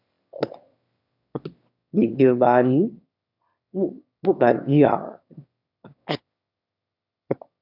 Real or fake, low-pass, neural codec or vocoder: fake; 5.4 kHz; autoencoder, 22.05 kHz, a latent of 192 numbers a frame, VITS, trained on one speaker